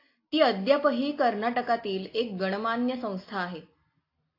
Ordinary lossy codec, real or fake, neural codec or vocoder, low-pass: AAC, 32 kbps; real; none; 5.4 kHz